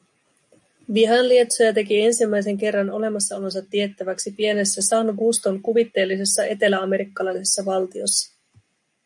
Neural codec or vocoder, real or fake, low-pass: none; real; 10.8 kHz